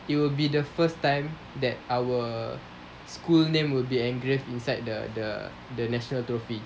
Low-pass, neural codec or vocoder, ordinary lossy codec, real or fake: none; none; none; real